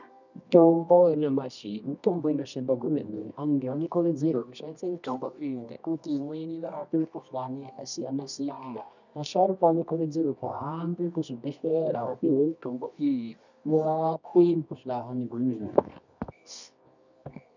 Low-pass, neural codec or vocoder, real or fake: 7.2 kHz; codec, 24 kHz, 0.9 kbps, WavTokenizer, medium music audio release; fake